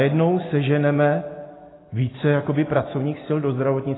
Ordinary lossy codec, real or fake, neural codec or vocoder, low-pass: AAC, 16 kbps; real; none; 7.2 kHz